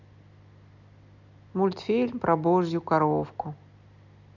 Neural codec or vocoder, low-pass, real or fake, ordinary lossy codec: none; 7.2 kHz; real; none